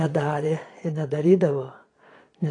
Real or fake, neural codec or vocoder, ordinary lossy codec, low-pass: fake; vocoder, 22.05 kHz, 80 mel bands, Vocos; AAC, 32 kbps; 9.9 kHz